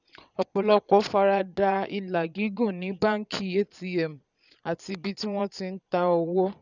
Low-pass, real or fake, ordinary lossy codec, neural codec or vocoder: 7.2 kHz; fake; none; vocoder, 22.05 kHz, 80 mel bands, Vocos